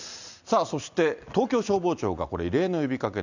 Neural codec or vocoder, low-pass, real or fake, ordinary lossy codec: none; 7.2 kHz; real; none